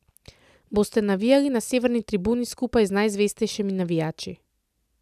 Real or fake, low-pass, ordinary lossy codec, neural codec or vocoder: real; 14.4 kHz; none; none